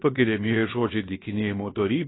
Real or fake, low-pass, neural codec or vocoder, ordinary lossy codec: fake; 7.2 kHz; codec, 16 kHz, about 1 kbps, DyCAST, with the encoder's durations; AAC, 16 kbps